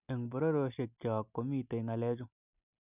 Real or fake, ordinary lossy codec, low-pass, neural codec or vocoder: real; none; 3.6 kHz; none